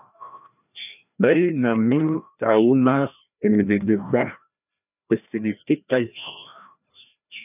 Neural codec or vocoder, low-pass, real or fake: codec, 16 kHz, 1 kbps, FreqCodec, larger model; 3.6 kHz; fake